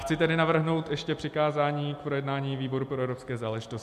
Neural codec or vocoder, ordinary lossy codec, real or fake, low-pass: none; MP3, 96 kbps; real; 14.4 kHz